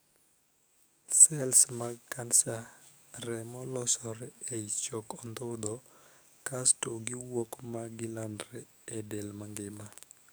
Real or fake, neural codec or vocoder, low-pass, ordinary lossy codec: fake; codec, 44.1 kHz, 7.8 kbps, DAC; none; none